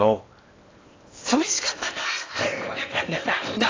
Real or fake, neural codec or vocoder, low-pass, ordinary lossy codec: fake; codec, 16 kHz in and 24 kHz out, 0.8 kbps, FocalCodec, streaming, 65536 codes; 7.2 kHz; AAC, 32 kbps